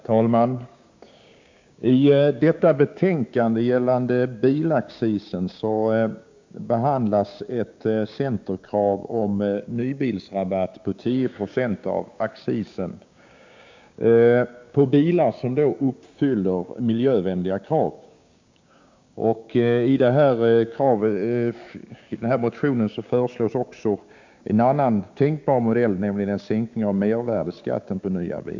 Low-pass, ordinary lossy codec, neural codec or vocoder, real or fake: 7.2 kHz; MP3, 64 kbps; codec, 16 kHz, 6 kbps, DAC; fake